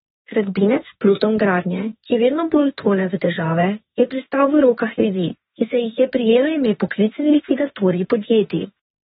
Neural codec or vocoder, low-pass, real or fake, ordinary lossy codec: autoencoder, 48 kHz, 32 numbers a frame, DAC-VAE, trained on Japanese speech; 19.8 kHz; fake; AAC, 16 kbps